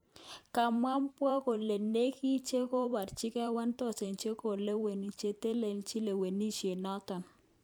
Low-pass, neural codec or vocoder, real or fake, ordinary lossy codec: none; vocoder, 44.1 kHz, 128 mel bands every 512 samples, BigVGAN v2; fake; none